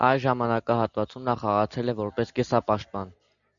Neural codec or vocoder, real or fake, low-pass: none; real; 7.2 kHz